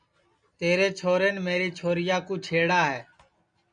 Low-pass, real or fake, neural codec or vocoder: 9.9 kHz; real; none